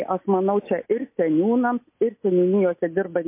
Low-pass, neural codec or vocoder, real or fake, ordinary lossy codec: 3.6 kHz; none; real; MP3, 24 kbps